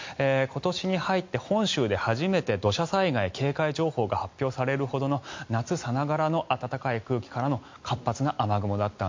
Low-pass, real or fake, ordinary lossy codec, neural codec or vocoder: 7.2 kHz; real; MP3, 48 kbps; none